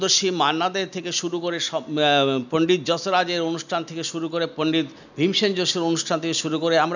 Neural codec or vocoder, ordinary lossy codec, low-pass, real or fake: none; none; 7.2 kHz; real